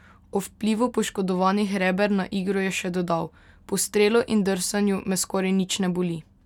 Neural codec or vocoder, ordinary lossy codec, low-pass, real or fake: none; none; 19.8 kHz; real